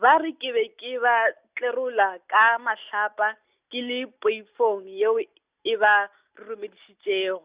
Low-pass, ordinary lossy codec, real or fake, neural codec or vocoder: 3.6 kHz; Opus, 64 kbps; real; none